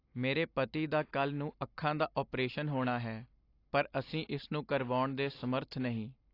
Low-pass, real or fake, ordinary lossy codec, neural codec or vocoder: 5.4 kHz; real; AAC, 32 kbps; none